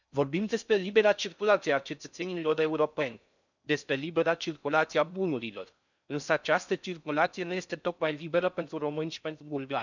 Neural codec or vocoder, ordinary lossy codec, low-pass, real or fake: codec, 16 kHz in and 24 kHz out, 0.6 kbps, FocalCodec, streaming, 4096 codes; none; 7.2 kHz; fake